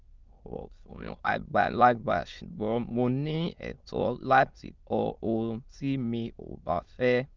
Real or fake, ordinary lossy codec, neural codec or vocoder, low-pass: fake; Opus, 24 kbps; autoencoder, 22.05 kHz, a latent of 192 numbers a frame, VITS, trained on many speakers; 7.2 kHz